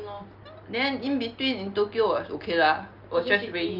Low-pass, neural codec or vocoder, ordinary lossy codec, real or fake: 5.4 kHz; none; Opus, 24 kbps; real